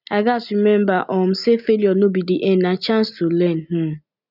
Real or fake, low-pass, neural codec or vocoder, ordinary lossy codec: real; 5.4 kHz; none; none